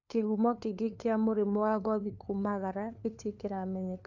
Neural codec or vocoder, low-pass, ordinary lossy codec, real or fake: codec, 16 kHz, 2 kbps, FunCodec, trained on LibriTTS, 25 frames a second; 7.2 kHz; AAC, 48 kbps; fake